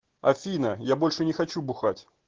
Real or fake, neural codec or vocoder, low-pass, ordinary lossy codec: real; none; 7.2 kHz; Opus, 16 kbps